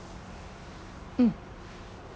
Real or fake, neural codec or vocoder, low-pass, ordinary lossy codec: real; none; none; none